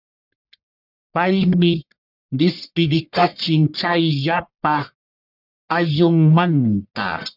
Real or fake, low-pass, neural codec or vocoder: fake; 5.4 kHz; codec, 44.1 kHz, 1.7 kbps, Pupu-Codec